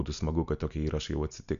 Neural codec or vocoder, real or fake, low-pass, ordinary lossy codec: none; real; 7.2 kHz; MP3, 96 kbps